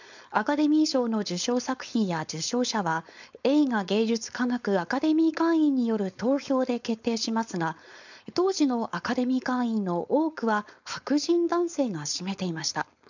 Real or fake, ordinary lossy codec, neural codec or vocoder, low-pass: fake; none; codec, 16 kHz, 4.8 kbps, FACodec; 7.2 kHz